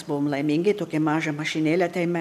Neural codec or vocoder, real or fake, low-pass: none; real; 14.4 kHz